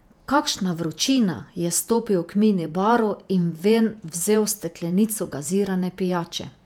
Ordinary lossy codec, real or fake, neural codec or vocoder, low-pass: none; fake; vocoder, 48 kHz, 128 mel bands, Vocos; 19.8 kHz